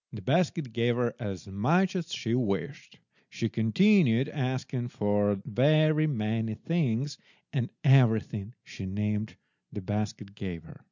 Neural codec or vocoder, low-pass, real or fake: none; 7.2 kHz; real